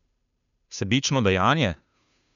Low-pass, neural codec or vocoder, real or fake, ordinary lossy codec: 7.2 kHz; codec, 16 kHz, 2 kbps, FunCodec, trained on Chinese and English, 25 frames a second; fake; none